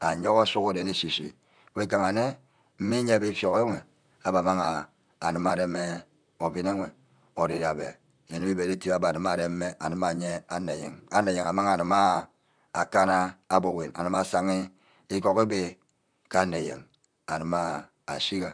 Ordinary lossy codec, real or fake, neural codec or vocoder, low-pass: none; fake; vocoder, 44.1 kHz, 128 mel bands, Pupu-Vocoder; 9.9 kHz